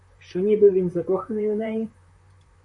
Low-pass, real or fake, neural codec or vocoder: 10.8 kHz; fake; vocoder, 44.1 kHz, 128 mel bands, Pupu-Vocoder